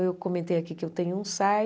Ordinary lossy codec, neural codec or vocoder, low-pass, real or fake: none; none; none; real